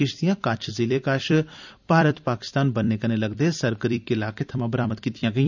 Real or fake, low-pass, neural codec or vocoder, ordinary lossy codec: fake; 7.2 kHz; vocoder, 44.1 kHz, 128 mel bands every 256 samples, BigVGAN v2; none